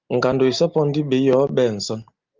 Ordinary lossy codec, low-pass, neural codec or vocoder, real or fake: Opus, 32 kbps; 7.2 kHz; none; real